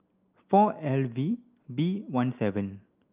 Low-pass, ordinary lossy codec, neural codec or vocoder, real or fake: 3.6 kHz; Opus, 32 kbps; none; real